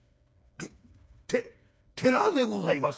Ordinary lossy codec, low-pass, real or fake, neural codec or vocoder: none; none; fake; codec, 16 kHz, 4 kbps, FreqCodec, smaller model